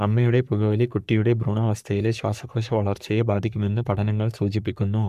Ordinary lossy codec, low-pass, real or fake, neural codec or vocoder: none; 14.4 kHz; fake; codec, 44.1 kHz, 3.4 kbps, Pupu-Codec